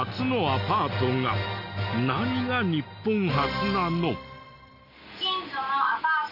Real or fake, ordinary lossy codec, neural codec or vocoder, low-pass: real; none; none; 5.4 kHz